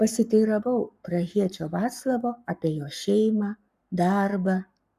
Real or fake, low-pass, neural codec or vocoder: fake; 14.4 kHz; codec, 44.1 kHz, 7.8 kbps, Pupu-Codec